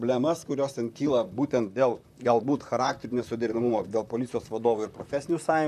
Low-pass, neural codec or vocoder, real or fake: 14.4 kHz; vocoder, 44.1 kHz, 128 mel bands, Pupu-Vocoder; fake